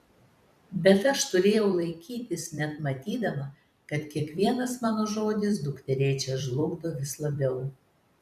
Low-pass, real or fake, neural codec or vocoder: 14.4 kHz; fake; vocoder, 44.1 kHz, 128 mel bands every 256 samples, BigVGAN v2